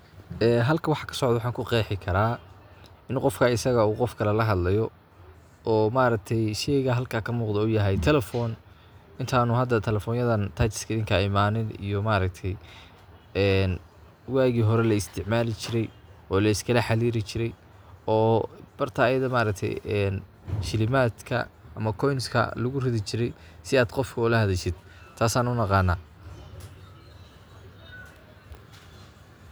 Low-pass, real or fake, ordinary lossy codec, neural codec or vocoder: none; real; none; none